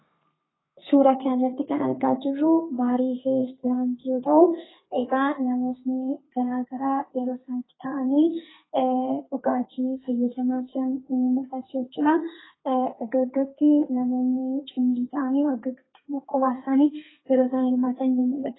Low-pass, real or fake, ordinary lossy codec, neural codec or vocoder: 7.2 kHz; fake; AAC, 16 kbps; codec, 32 kHz, 1.9 kbps, SNAC